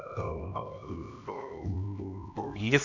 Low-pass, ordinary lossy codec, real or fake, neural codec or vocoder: 7.2 kHz; none; fake; codec, 16 kHz, 1 kbps, X-Codec, HuBERT features, trained on LibriSpeech